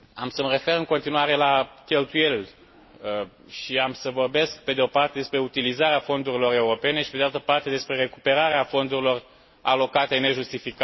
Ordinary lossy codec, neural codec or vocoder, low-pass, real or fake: MP3, 24 kbps; none; 7.2 kHz; real